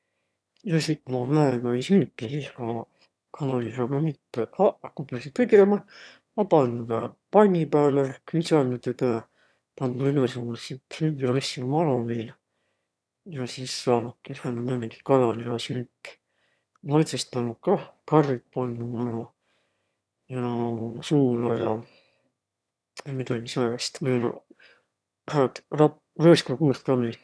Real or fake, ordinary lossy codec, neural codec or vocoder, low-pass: fake; none; autoencoder, 22.05 kHz, a latent of 192 numbers a frame, VITS, trained on one speaker; none